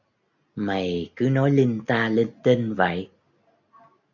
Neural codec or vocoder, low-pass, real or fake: none; 7.2 kHz; real